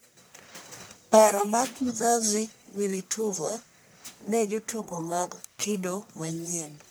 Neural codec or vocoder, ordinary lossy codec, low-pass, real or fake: codec, 44.1 kHz, 1.7 kbps, Pupu-Codec; none; none; fake